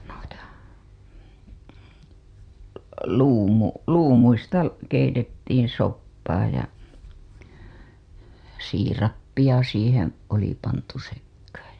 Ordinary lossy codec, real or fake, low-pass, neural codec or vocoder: Opus, 64 kbps; fake; 9.9 kHz; vocoder, 44.1 kHz, 128 mel bands every 256 samples, BigVGAN v2